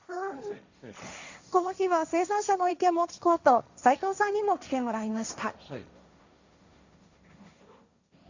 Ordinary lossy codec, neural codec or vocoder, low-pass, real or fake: Opus, 64 kbps; codec, 16 kHz, 1.1 kbps, Voila-Tokenizer; 7.2 kHz; fake